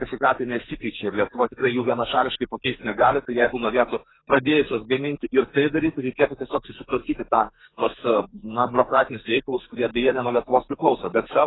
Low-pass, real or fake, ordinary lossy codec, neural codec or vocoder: 7.2 kHz; fake; AAC, 16 kbps; codec, 44.1 kHz, 2.6 kbps, SNAC